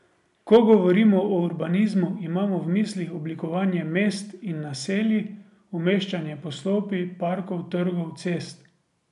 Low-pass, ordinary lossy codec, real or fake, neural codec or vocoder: 10.8 kHz; none; real; none